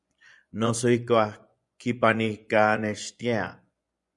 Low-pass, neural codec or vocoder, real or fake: 10.8 kHz; vocoder, 24 kHz, 100 mel bands, Vocos; fake